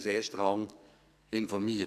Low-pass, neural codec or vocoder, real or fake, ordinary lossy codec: 14.4 kHz; codec, 44.1 kHz, 2.6 kbps, SNAC; fake; none